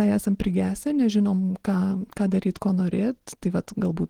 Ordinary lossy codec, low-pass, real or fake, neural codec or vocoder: Opus, 16 kbps; 19.8 kHz; real; none